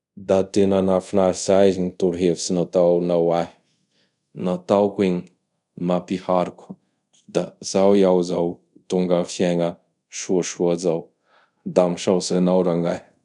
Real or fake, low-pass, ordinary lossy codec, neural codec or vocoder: fake; 10.8 kHz; none; codec, 24 kHz, 0.5 kbps, DualCodec